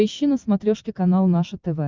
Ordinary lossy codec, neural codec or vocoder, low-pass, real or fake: Opus, 24 kbps; none; 7.2 kHz; real